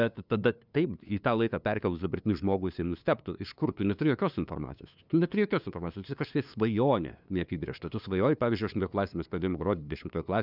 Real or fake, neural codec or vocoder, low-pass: fake; codec, 16 kHz, 2 kbps, FunCodec, trained on LibriTTS, 25 frames a second; 5.4 kHz